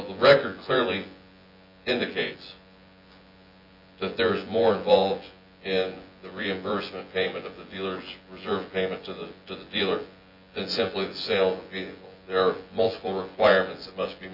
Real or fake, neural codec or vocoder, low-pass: fake; vocoder, 24 kHz, 100 mel bands, Vocos; 5.4 kHz